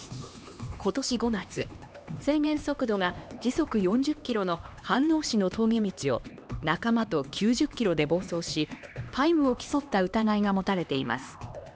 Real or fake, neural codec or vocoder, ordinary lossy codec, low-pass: fake; codec, 16 kHz, 2 kbps, X-Codec, HuBERT features, trained on LibriSpeech; none; none